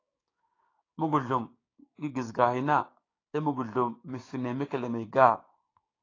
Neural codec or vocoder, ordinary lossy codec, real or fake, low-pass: codec, 16 kHz, 6 kbps, DAC; AAC, 32 kbps; fake; 7.2 kHz